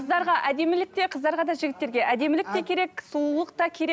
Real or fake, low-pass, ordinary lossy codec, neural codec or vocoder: real; none; none; none